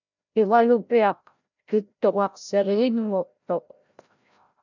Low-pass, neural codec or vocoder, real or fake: 7.2 kHz; codec, 16 kHz, 0.5 kbps, FreqCodec, larger model; fake